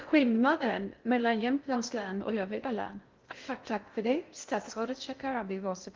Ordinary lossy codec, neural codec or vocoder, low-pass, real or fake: Opus, 32 kbps; codec, 16 kHz in and 24 kHz out, 0.6 kbps, FocalCodec, streaming, 2048 codes; 7.2 kHz; fake